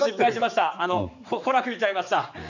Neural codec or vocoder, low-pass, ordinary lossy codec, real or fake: codec, 16 kHz, 4 kbps, X-Codec, HuBERT features, trained on general audio; 7.2 kHz; none; fake